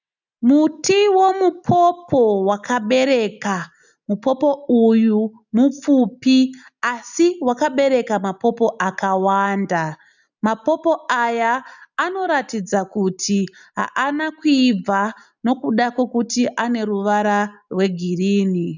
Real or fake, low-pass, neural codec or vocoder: real; 7.2 kHz; none